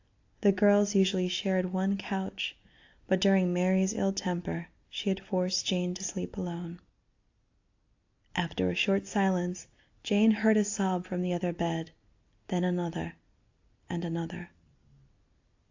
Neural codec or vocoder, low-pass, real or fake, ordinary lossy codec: none; 7.2 kHz; real; AAC, 48 kbps